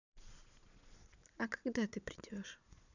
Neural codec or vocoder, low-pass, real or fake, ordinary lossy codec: vocoder, 22.05 kHz, 80 mel bands, Vocos; 7.2 kHz; fake; none